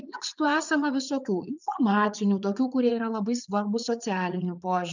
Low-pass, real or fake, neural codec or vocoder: 7.2 kHz; fake; vocoder, 44.1 kHz, 80 mel bands, Vocos